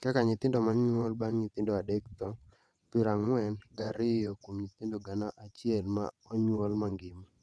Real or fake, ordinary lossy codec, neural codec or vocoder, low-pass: fake; none; vocoder, 22.05 kHz, 80 mel bands, WaveNeXt; none